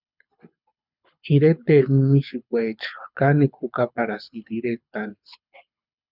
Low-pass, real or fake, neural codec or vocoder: 5.4 kHz; fake; codec, 24 kHz, 6 kbps, HILCodec